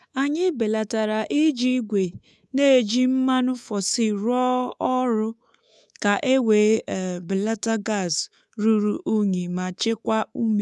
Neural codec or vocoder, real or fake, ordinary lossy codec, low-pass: codec, 24 kHz, 3.1 kbps, DualCodec; fake; none; none